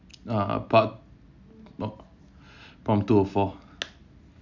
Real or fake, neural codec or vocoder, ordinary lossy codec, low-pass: real; none; none; 7.2 kHz